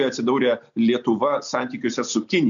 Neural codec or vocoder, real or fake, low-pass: none; real; 7.2 kHz